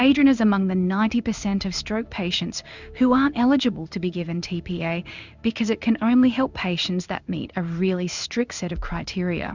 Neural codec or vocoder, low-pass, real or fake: codec, 16 kHz in and 24 kHz out, 1 kbps, XY-Tokenizer; 7.2 kHz; fake